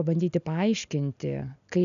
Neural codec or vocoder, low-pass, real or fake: none; 7.2 kHz; real